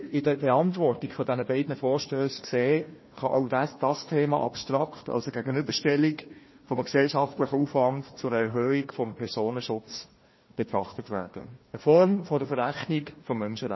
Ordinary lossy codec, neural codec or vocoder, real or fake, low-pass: MP3, 24 kbps; codec, 16 kHz, 1 kbps, FunCodec, trained on Chinese and English, 50 frames a second; fake; 7.2 kHz